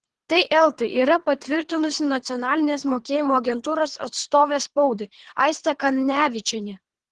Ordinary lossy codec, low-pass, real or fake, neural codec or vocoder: Opus, 16 kbps; 10.8 kHz; fake; codec, 24 kHz, 3 kbps, HILCodec